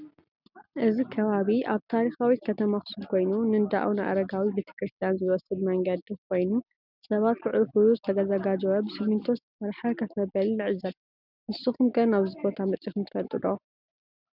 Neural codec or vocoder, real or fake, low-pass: none; real; 5.4 kHz